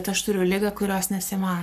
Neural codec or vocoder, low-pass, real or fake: codec, 44.1 kHz, 7.8 kbps, Pupu-Codec; 14.4 kHz; fake